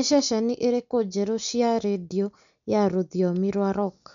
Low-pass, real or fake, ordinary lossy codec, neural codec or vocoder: 7.2 kHz; real; none; none